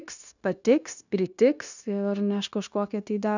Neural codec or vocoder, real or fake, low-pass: codec, 16 kHz, 0.9 kbps, LongCat-Audio-Codec; fake; 7.2 kHz